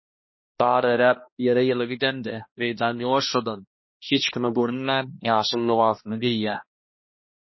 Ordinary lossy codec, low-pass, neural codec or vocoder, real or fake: MP3, 24 kbps; 7.2 kHz; codec, 16 kHz, 1 kbps, X-Codec, HuBERT features, trained on balanced general audio; fake